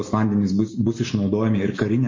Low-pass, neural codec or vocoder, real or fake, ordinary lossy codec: 7.2 kHz; none; real; MP3, 32 kbps